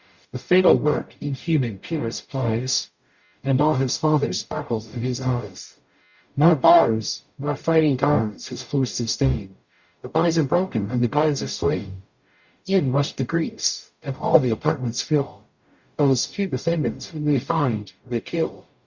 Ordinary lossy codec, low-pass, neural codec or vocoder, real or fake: Opus, 64 kbps; 7.2 kHz; codec, 44.1 kHz, 0.9 kbps, DAC; fake